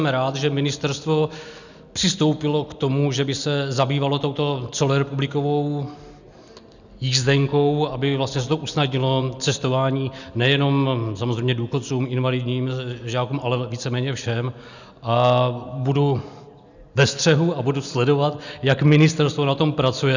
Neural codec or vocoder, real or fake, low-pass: none; real; 7.2 kHz